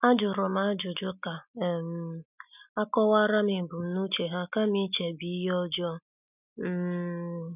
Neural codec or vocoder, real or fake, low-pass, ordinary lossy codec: none; real; 3.6 kHz; none